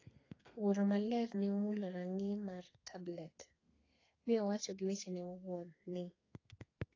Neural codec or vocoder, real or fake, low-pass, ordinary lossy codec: codec, 32 kHz, 1.9 kbps, SNAC; fake; 7.2 kHz; AAC, 32 kbps